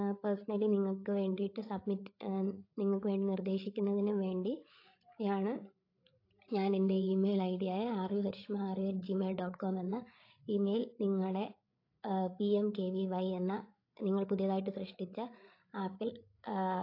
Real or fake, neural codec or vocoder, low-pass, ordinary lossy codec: fake; codec, 16 kHz, 8 kbps, FreqCodec, larger model; 5.4 kHz; AAC, 32 kbps